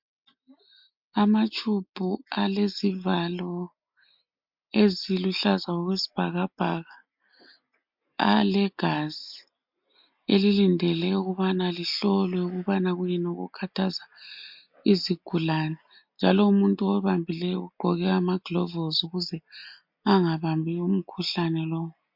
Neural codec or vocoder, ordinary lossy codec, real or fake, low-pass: none; MP3, 48 kbps; real; 5.4 kHz